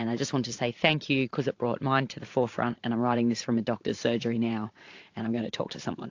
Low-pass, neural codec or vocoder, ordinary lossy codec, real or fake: 7.2 kHz; vocoder, 44.1 kHz, 128 mel bands every 512 samples, BigVGAN v2; AAC, 48 kbps; fake